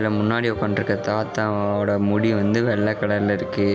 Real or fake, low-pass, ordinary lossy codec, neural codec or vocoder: real; none; none; none